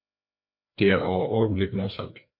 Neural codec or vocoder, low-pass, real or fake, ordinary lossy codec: codec, 16 kHz, 2 kbps, FreqCodec, larger model; 5.4 kHz; fake; MP3, 32 kbps